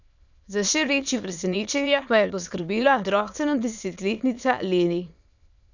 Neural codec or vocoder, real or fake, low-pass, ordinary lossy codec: autoencoder, 22.05 kHz, a latent of 192 numbers a frame, VITS, trained on many speakers; fake; 7.2 kHz; none